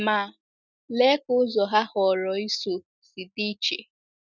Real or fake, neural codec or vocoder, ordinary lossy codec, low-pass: real; none; none; 7.2 kHz